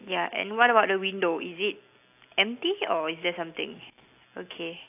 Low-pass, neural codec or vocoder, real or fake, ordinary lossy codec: 3.6 kHz; none; real; AAC, 32 kbps